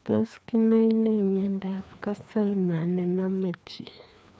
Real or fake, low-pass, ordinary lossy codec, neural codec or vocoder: fake; none; none; codec, 16 kHz, 2 kbps, FreqCodec, larger model